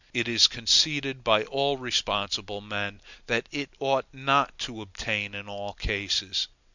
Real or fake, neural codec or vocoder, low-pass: real; none; 7.2 kHz